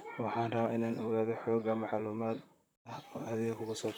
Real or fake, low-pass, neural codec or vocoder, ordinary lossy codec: fake; none; vocoder, 44.1 kHz, 128 mel bands, Pupu-Vocoder; none